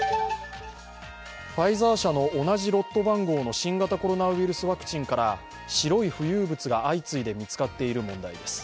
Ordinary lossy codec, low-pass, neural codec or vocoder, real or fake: none; none; none; real